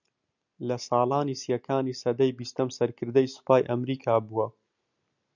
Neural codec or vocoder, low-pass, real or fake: none; 7.2 kHz; real